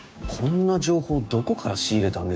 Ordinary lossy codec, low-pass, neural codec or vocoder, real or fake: none; none; codec, 16 kHz, 6 kbps, DAC; fake